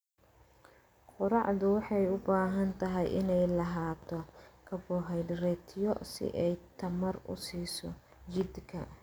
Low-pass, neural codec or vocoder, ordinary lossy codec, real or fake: none; none; none; real